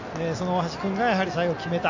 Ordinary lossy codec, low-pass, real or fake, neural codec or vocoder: AAC, 48 kbps; 7.2 kHz; real; none